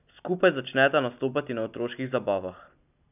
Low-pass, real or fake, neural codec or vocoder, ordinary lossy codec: 3.6 kHz; real; none; none